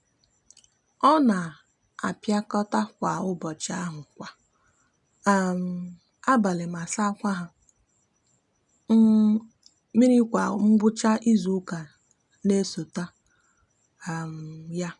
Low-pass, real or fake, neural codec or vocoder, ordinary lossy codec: 10.8 kHz; real; none; none